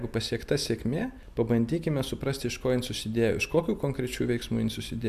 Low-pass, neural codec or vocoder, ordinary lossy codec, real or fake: 14.4 kHz; none; MP3, 96 kbps; real